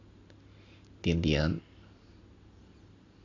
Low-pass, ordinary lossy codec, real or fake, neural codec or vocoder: 7.2 kHz; AAC, 32 kbps; real; none